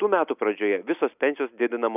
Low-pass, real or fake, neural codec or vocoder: 3.6 kHz; real; none